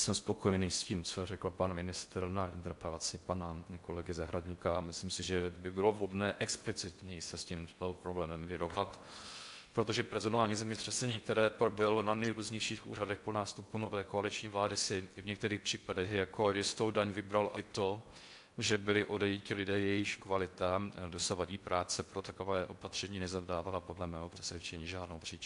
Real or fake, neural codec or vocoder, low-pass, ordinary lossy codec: fake; codec, 16 kHz in and 24 kHz out, 0.6 kbps, FocalCodec, streaming, 4096 codes; 10.8 kHz; AAC, 64 kbps